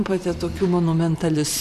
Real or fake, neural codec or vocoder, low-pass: fake; vocoder, 44.1 kHz, 128 mel bands every 512 samples, BigVGAN v2; 14.4 kHz